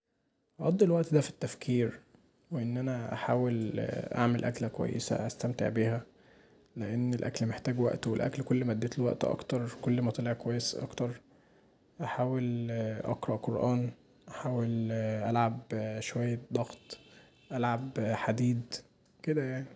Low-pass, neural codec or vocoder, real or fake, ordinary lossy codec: none; none; real; none